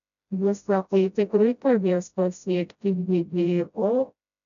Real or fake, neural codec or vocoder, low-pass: fake; codec, 16 kHz, 0.5 kbps, FreqCodec, smaller model; 7.2 kHz